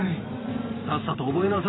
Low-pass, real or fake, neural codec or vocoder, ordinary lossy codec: 7.2 kHz; fake; vocoder, 44.1 kHz, 128 mel bands every 512 samples, BigVGAN v2; AAC, 16 kbps